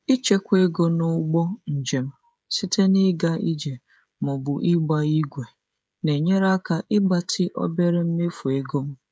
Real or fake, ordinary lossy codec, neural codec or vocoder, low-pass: fake; none; codec, 16 kHz, 16 kbps, FreqCodec, smaller model; none